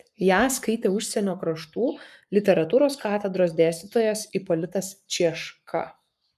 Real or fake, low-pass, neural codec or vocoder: fake; 14.4 kHz; codec, 44.1 kHz, 7.8 kbps, Pupu-Codec